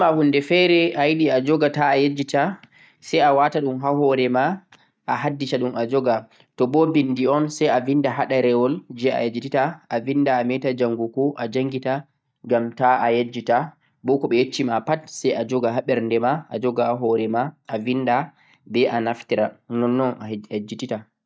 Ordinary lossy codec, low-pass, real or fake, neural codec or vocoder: none; none; real; none